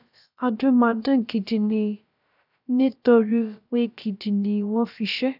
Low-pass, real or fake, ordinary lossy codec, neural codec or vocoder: 5.4 kHz; fake; none; codec, 16 kHz, about 1 kbps, DyCAST, with the encoder's durations